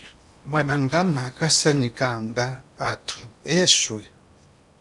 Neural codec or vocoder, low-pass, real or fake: codec, 16 kHz in and 24 kHz out, 0.8 kbps, FocalCodec, streaming, 65536 codes; 10.8 kHz; fake